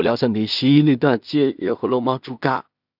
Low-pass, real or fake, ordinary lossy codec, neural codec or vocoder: 5.4 kHz; fake; none; codec, 16 kHz in and 24 kHz out, 0.4 kbps, LongCat-Audio-Codec, two codebook decoder